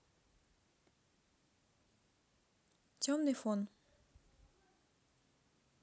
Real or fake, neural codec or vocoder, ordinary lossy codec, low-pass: real; none; none; none